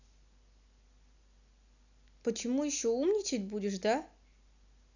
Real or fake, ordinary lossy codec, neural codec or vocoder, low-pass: real; none; none; 7.2 kHz